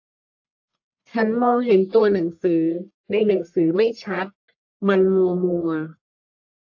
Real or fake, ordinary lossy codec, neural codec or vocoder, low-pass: fake; AAC, 48 kbps; codec, 44.1 kHz, 1.7 kbps, Pupu-Codec; 7.2 kHz